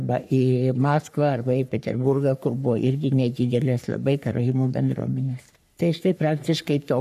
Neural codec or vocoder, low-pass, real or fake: codec, 44.1 kHz, 3.4 kbps, Pupu-Codec; 14.4 kHz; fake